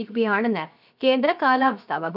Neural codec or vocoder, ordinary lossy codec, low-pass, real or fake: codec, 16 kHz, 0.7 kbps, FocalCodec; none; 5.4 kHz; fake